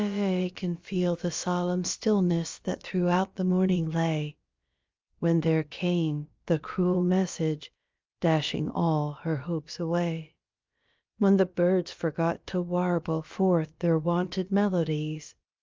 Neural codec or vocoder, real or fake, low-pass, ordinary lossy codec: codec, 16 kHz, about 1 kbps, DyCAST, with the encoder's durations; fake; 7.2 kHz; Opus, 32 kbps